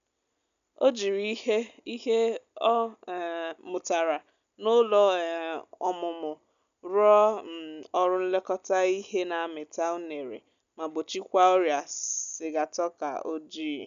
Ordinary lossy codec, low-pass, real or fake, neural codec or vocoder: none; 7.2 kHz; real; none